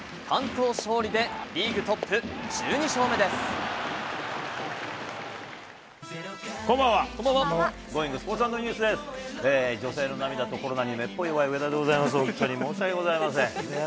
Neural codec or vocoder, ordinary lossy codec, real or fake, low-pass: none; none; real; none